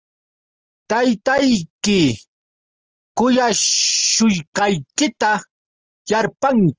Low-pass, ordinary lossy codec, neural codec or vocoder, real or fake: 7.2 kHz; Opus, 24 kbps; none; real